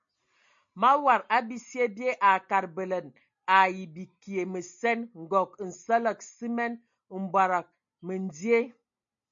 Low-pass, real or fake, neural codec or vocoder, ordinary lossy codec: 7.2 kHz; real; none; MP3, 48 kbps